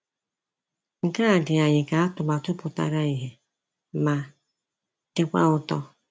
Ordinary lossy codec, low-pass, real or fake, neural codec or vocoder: none; none; real; none